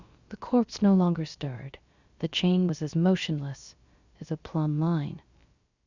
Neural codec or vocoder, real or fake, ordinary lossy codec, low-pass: codec, 16 kHz, about 1 kbps, DyCAST, with the encoder's durations; fake; Opus, 64 kbps; 7.2 kHz